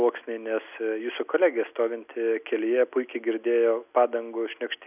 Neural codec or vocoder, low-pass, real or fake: none; 3.6 kHz; real